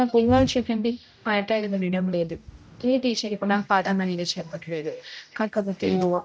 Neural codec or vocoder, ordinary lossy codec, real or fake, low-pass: codec, 16 kHz, 0.5 kbps, X-Codec, HuBERT features, trained on general audio; none; fake; none